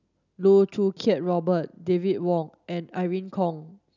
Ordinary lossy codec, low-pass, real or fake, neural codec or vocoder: none; 7.2 kHz; real; none